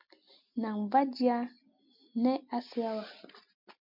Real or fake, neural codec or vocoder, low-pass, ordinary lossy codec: real; none; 5.4 kHz; MP3, 48 kbps